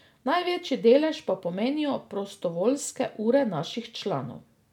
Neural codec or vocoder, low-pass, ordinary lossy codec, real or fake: none; 19.8 kHz; none; real